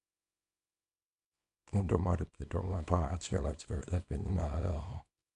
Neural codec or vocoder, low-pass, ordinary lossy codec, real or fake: codec, 24 kHz, 0.9 kbps, WavTokenizer, small release; 10.8 kHz; none; fake